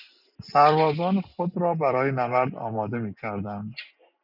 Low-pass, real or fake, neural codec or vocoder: 5.4 kHz; real; none